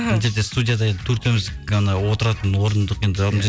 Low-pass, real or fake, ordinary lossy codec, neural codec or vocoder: none; real; none; none